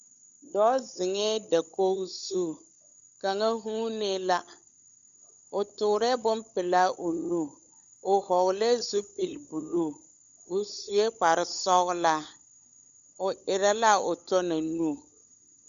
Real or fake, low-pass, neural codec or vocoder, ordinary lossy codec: fake; 7.2 kHz; codec, 16 kHz, 16 kbps, FunCodec, trained on LibriTTS, 50 frames a second; MP3, 64 kbps